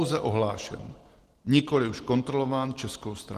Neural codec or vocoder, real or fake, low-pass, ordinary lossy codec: none; real; 14.4 kHz; Opus, 16 kbps